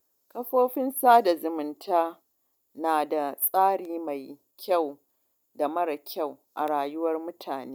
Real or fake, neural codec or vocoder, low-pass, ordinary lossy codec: real; none; none; none